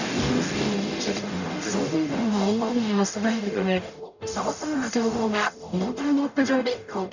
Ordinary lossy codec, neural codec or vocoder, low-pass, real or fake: none; codec, 44.1 kHz, 0.9 kbps, DAC; 7.2 kHz; fake